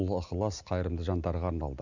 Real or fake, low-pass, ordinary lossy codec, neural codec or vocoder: real; 7.2 kHz; none; none